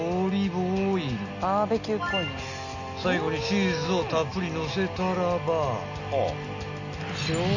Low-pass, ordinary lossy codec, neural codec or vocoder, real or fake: 7.2 kHz; none; none; real